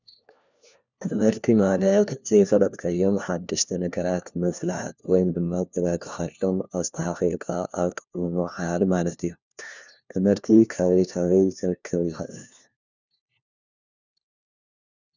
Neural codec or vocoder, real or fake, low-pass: codec, 16 kHz, 1 kbps, FunCodec, trained on LibriTTS, 50 frames a second; fake; 7.2 kHz